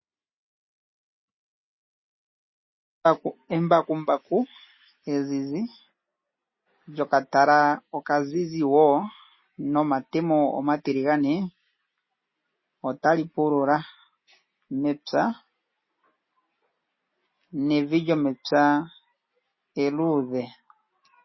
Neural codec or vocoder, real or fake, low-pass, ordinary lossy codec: none; real; 7.2 kHz; MP3, 24 kbps